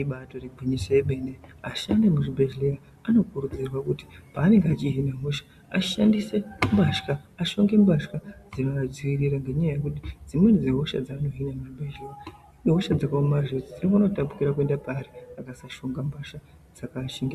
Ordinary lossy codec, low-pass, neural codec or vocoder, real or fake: Opus, 64 kbps; 14.4 kHz; none; real